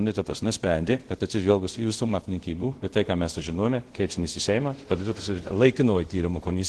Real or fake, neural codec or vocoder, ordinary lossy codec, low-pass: fake; codec, 24 kHz, 0.5 kbps, DualCodec; Opus, 16 kbps; 10.8 kHz